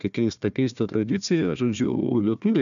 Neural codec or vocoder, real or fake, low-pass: codec, 16 kHz, 1 kbps, FunCodec, trained on Chinese and English, 50 frames a second; fake; 7.2 kHz